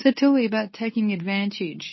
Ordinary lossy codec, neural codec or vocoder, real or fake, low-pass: MP3, 24 kbps; codec, 24 kHz, 0.9 kbps, WavTokenizer, medium speech release version 2; fake; 7.2 kHz